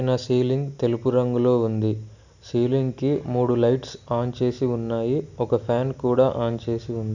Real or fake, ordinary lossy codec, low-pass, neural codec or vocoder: real; none; 7.2 kHz; none